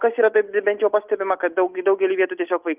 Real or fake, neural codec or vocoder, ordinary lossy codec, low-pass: real; none; Opus, 32 kbps; 3.6 kHz